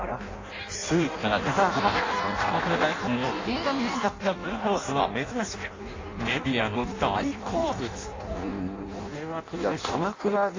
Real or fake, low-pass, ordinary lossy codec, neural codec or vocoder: fake; 7.2 kHz; AAC, 32 kbps; codec, 16 kHz in and 24 kHz out, 0.6 kbps, FireRedTTS-2 codec